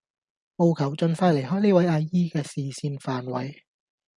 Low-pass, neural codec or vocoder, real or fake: 10.8 kHz; none; real